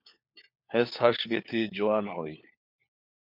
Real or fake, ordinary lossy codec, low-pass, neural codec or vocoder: fake; MP3, 48 kbps; 5.4 kHz; codec, 16 kHz, 4 kbps, FunCodec, trained on LibriTTS, 50 frames a second